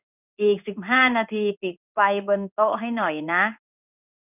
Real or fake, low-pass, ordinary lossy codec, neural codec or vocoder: fake; 3.6 kHz; none; codec, 16 kHz in and 24 kHz out, 1 kbps, XY-Tokenizer